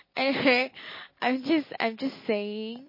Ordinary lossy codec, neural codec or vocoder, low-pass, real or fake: MP3, 24 kbps; none; 5.4 kHz; real